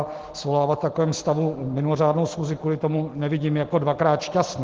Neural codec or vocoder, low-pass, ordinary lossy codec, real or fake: none; 7.2 kHz; Opus, 16 kbps; real